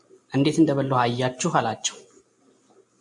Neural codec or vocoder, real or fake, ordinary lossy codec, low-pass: none; real; MP3, 64 kbps; 10.8 kHz